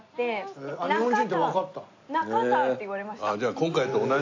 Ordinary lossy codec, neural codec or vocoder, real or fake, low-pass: AAC, 48 kbps; none; real; 7.2 kHz